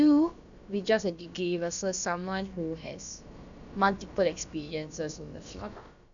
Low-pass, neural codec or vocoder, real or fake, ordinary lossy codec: 7.2 kHz; codec, 16 kHz, about 1 kbps, DyCAST, with the encoder's durations; fake; none